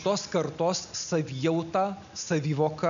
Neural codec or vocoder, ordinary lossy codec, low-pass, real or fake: none; MP3, 96 kbps; 7.2 kHz; real